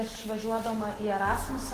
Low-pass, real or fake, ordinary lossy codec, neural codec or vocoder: 14.4 kHz; fake; Opus, 16 kbps; autoencoder, 48 kHz, 128 numbers a frame, DAC-VAE, trained on Japanese speech